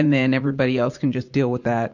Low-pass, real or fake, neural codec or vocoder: 7.2 kHz; fake; vocoder, 44.1 kHz, 80 mel bands, Vocos